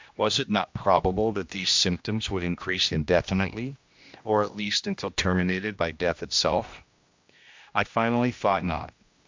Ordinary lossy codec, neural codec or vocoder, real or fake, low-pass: MP3, 64 kbps; codec, 16 kHz, 1 kbps, X-Codec, HuBERT features, trained on general audio; fake; 7.2 kHz